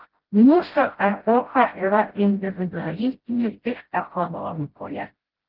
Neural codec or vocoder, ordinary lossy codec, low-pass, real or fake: codec, 16 kHz, 0.5 kbps, FreqCodec, smaller model; Opus, 16 kbps; 5.4 kHz; fake